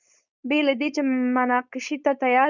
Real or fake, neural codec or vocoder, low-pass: fake; codec, 16 kHz, 4.8 kbps, FACodec; 7.2 kHz